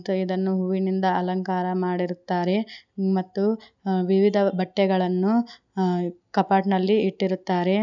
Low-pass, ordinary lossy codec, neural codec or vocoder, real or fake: 7.2 kHz; none; none; real